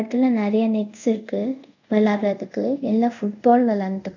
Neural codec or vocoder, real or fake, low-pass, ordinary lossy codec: codec, 24 kHz, 0.5 kbps, DualCodec; fake; 7.2 kHz; none